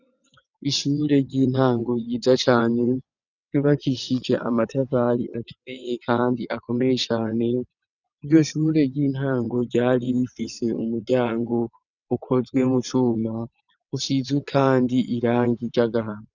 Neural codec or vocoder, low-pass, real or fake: vocoder, 22.05 kHz, 80 mel bands, WaveNeXt; 7.2 kHz; fake